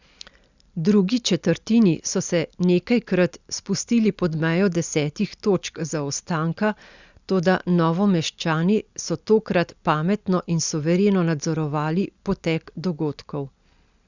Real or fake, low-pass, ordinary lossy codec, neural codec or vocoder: real; 7.2 kHz; Opus, 64 kbps; none